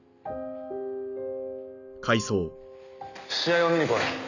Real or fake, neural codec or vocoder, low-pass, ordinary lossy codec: real; none; 7.2 kHz; none